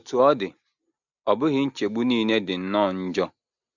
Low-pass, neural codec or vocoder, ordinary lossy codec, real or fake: 7.2 kHz; none; none; real